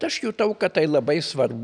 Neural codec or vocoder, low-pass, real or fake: none; 9.9 kHz; real